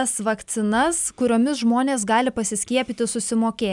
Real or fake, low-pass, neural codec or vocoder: real; 10.8 kHz; none